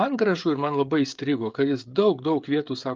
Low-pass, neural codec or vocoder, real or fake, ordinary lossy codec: 7.2 kHz; codec, 16 kHz, 16 kbps, FreqCodec, smaller model; fake; Opus, 24 kbps